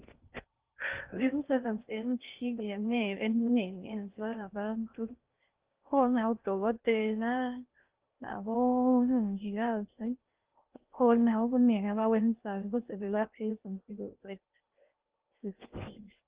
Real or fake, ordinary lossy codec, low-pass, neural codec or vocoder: fake; Opus, 32 kbps; 3.6 kHz; codec, 16 kHz in and 24 kHz out, 0.6 kbps, FocalCodec, streaming, 4096 codes